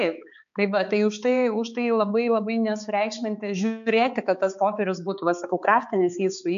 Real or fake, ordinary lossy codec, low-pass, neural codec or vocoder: fake; MP3, 64 kbps; 7.2 kHz; codec, 16 kHz, 4 kbps, X-Codec, HuBERT features, trained on balanced general audio